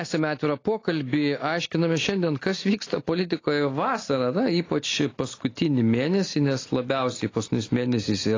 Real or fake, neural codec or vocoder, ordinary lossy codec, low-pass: real; none; AAC, 32 kbps; 7.2 kHz